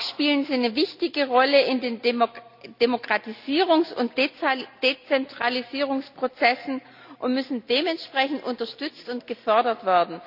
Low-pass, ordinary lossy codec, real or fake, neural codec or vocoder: 5.4 kHz; none; real; none